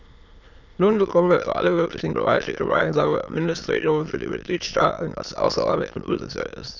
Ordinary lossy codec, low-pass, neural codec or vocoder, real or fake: none; 7.2 kHz; autoencoder, 22.05 kHz, a latent of 192 numbers a frame, VITS, trained on many speakers; fake